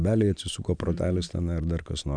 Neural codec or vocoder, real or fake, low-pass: none; real; 9.9 kHz